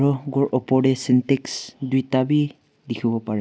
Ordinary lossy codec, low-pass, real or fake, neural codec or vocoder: none; none; real; none